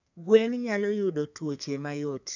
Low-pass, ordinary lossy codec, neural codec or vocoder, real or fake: 7.2 kHz; none; codec, 32 kHz, 1.9 kbps, SNAC; fake